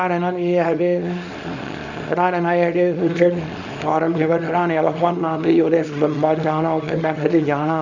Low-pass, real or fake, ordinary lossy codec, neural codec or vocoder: 7.2 kHz; fake; none; codec, 24 kHz, 0.9 kbps, WavTokenizer, small release